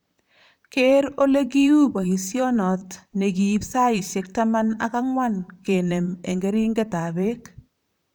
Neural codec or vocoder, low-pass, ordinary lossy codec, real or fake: vocoder, 44.1 kHz, 128 mel bands, Pupu-Vocoder; none; none; fake